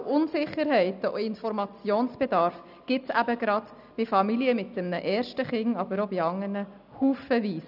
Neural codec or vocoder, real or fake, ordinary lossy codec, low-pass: none; real; none; 5.4 kHz